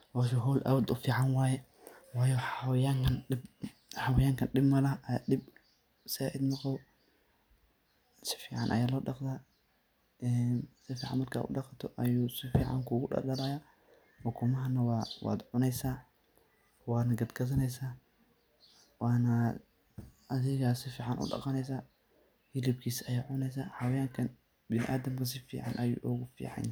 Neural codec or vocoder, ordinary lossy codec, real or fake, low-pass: none; none; real; none